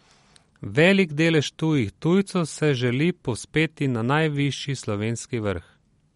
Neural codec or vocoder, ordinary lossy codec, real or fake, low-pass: none; MP3, 48 kbps; real; 19.8 kHz